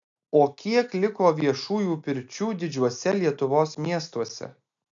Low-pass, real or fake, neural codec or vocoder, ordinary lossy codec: 7.2 kHz; real; none; MP3, 64 kbps